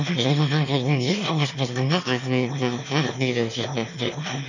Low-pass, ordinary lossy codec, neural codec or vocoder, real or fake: 7.2 kHz; none; autoencoder, 22.05 kHz, a latent of 192 numbers a frame, VITS, trained on one speaker; fake